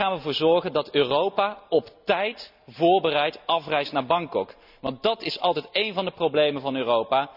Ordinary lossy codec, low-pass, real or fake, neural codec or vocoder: none; 5.4 kHz; real; none